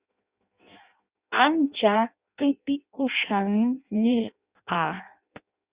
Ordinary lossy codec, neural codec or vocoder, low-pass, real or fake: Opus, 64 kbps; codec, 16 kHz in and 24 kHz out, 0.6 kbps, FireRedTTS-2 codec; 3.6 kHz; fake